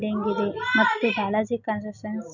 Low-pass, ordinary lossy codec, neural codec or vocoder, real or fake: 7.2 kHz; none; none; real